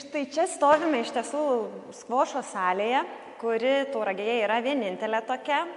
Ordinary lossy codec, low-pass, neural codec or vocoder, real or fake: MP3, 64 kbps; 10.8 kHz; none; real